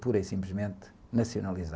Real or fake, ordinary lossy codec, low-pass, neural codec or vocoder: real; none; none; none